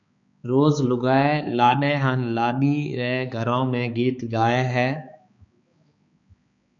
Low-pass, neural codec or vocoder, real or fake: 7.2 kHz; codec, 16 kHz, 4 kbps, X-Codec, HuBERT features, trained on balanced general audio; fake